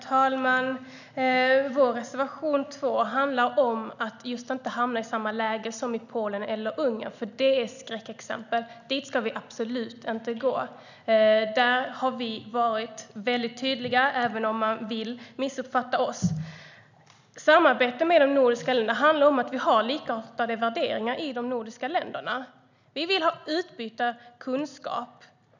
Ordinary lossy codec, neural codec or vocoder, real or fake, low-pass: none; none; real; 7.2 kHz